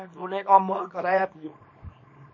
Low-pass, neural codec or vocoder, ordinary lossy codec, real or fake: 7.2 kHz; codec, 24 kHz, 0.9 kbps, WavTokenizer, small release; MP3, 32 kbps; fake